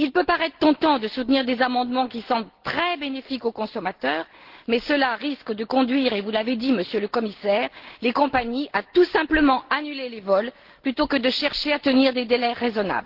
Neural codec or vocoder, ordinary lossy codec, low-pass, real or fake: none; Opus, 16 kbps; 5.4 kHz; real